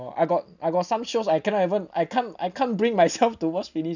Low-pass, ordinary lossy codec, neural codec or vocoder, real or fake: 7.2 kHz; none; none; real